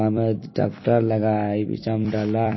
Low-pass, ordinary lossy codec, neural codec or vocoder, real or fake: 7.2 kHz; MP3, 24 kbps; vocoder, 44.1 kHz, 128 mel bands every 256 samples, BigVGAN v2; fake